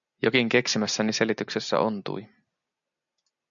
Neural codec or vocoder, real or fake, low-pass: none; real; 7.2 kHz